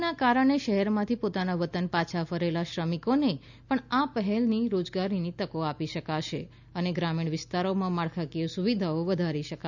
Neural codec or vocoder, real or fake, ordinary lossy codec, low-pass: none; real; none; 7.2 kHz